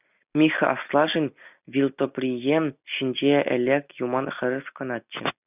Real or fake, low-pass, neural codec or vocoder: real; 3.6 kHz; none